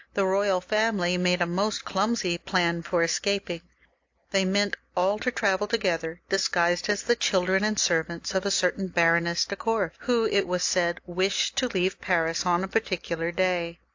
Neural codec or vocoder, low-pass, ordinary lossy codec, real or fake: none; 7.2 kHz; AAC, 48 kbps; real